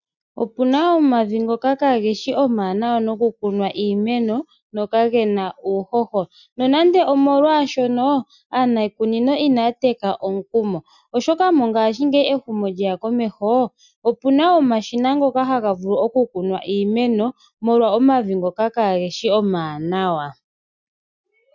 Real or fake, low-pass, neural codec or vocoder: real; 7.2 kHz; none